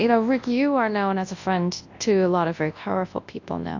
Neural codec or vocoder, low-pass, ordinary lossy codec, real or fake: codec, 24 kHz, 0.9 kbps, WavTokenizer, large speech release; 7.2 kHz; AAC, 48 kbps; fake